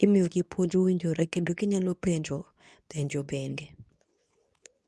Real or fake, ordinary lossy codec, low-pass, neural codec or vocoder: fake; none; none; codec, 24 kHz, 0.9 kbps, WavTokenizer, medium speech release version 2